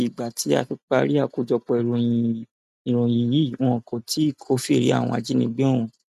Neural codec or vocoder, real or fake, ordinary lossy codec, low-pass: none; real; none; 14.4 kHz